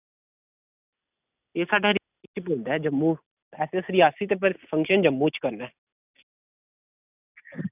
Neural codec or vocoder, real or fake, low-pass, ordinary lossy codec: none; real; 3.6 kHz; none